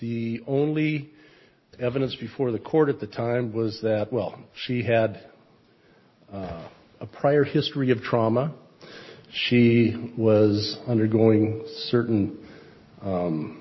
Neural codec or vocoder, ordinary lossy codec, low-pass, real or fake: none; MP3, 24 kbps; 7.2 kHz; real